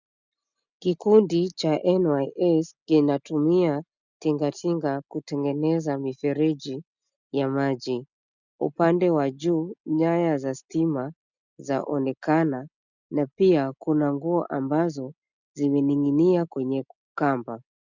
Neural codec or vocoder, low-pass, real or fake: none; 7.2 kHz; real